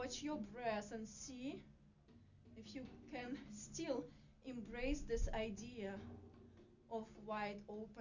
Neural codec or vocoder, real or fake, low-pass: none; real; 7.2 kHz